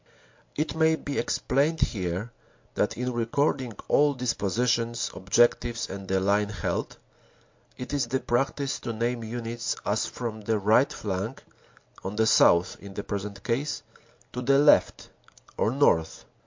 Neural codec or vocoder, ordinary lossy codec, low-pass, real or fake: none; MP3, 48 kbps; 7.2 kHz; real